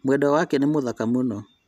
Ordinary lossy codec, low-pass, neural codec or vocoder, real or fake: none; 14.4 kHz; none; real